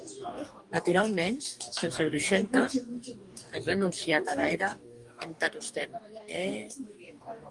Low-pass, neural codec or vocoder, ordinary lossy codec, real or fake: 10.8 kHz; codec, 44.1 kHz, 2.6 kbps, DAC; Opus, 32 kbps; fake